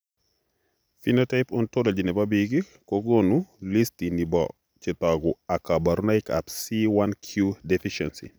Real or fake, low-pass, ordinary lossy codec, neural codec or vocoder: real; none; none; none